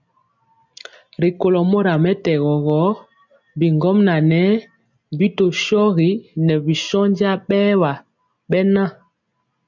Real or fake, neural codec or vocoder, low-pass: real; none; 7.2 kHz